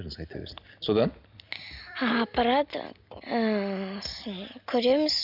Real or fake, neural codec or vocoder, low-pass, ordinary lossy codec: real; none; 5.4 kHz; none